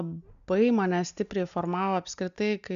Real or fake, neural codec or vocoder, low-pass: real; none; 7.2 kHz